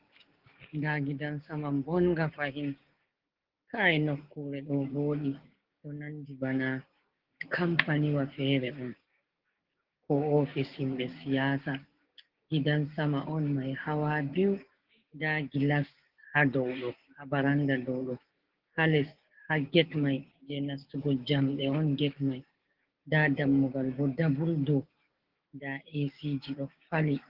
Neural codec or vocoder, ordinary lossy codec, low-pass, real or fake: codec, 16 kHz, 6 kbps, DAC; Opus, 16 kbps; 5.4 kHz; fake